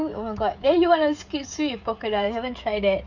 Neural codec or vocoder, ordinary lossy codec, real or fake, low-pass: codec, 16 kHz, 16 kbps, FreqCodec, smaller model; none; fake; 7.2 kHz